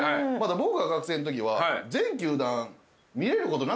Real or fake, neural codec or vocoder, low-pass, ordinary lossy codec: real; none; none; none